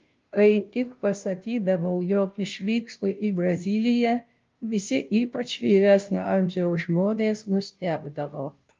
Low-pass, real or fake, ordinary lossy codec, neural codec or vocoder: 7.2 kHz; fake; Opus, 32 kbps; codec, 16 kHz, 0.5 kbps, FunCodec, trained on Chinese and English, 25 frames a second